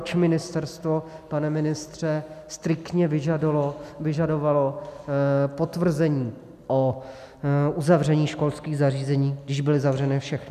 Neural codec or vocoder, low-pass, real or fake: none; 14.4 kHz; real